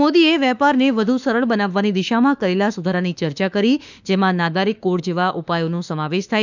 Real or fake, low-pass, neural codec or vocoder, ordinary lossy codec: fake; 7.2 kHz; autoencoder, 48 kHz, 32 numbers a frame, DAC-VAE, trained on Japanese speech; none